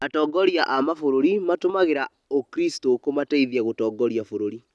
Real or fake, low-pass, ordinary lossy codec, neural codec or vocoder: real; none; none; none